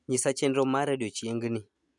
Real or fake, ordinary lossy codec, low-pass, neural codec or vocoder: real; none; 10.8 kHz; none